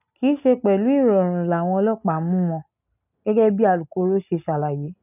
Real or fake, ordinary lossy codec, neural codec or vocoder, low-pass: real; none; none; 3.6 kHz